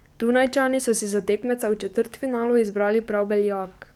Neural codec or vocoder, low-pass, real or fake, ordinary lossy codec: codec, 44.1 kHz, 7.8 kbps, DAC; 19.8 kHz; fake; none